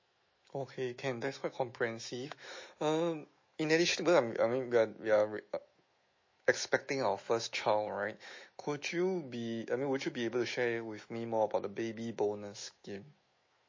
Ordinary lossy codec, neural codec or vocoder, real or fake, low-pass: MP3, 32 kbps; autoencoder, 48 kHz, 128 numbers a frame, DAC-VAE, trained on Japanese speech; fake; 7.2 kHz